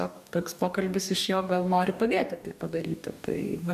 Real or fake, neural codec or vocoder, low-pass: fake; codec, 44.1 kHz, 2.6 kbps, DAC; 14.4 kHz